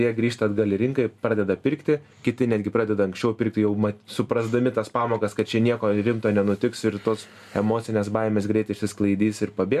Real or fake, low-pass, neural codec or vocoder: real; 14.4 kHz; none